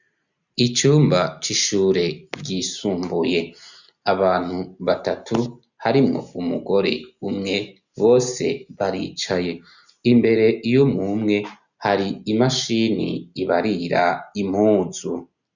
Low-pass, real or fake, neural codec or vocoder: 7.2 kHz; real; none